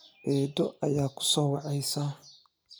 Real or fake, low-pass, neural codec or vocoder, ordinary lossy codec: real; none; none; none